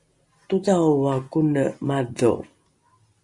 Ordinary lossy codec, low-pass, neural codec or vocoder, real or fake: Opus, 64 kbps; 10.8 kHz; none; real